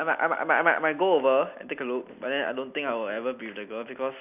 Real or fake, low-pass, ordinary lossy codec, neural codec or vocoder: real; 3.6 kHz; none; none